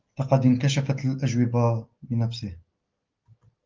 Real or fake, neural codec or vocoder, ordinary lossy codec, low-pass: real; none; Opus, 32 kbps; 7.2 kHz